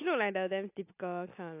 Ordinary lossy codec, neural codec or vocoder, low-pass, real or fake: AAC, 32 kbps; none; 3.6 kHz; real